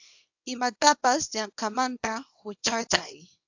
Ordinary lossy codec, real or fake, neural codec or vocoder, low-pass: AAC, 48 kbps; fake; codec, 24 kHz, 0.9 kbps, WavTokenizer, small release; 7.2 kHz